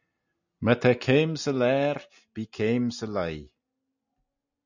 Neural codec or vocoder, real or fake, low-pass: none; real; 7.2 kHz